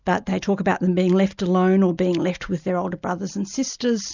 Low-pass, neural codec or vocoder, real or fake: 7.2 kHz; none; real